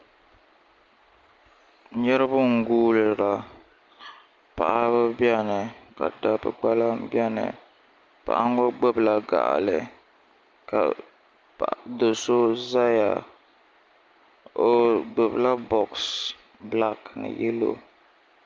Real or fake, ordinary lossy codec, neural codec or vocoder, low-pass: real; Opus, 32 kbps; none; 7.2 kHz